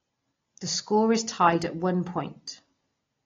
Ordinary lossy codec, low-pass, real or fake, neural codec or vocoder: AAC, 32 kbps; 7.2 kHz; real; none